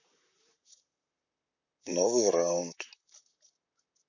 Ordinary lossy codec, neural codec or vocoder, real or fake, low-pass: none; vocoder, 44.1 kHz, 128 mel bands, Pupu-Vocoder; fake; 7.2 kHz